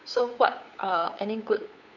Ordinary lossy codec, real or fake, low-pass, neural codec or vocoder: none; fake; 7.2 kHz; codec, 16 kHz, 8 kbps, FunCodec, trained on LibriTTS, 25 frames a second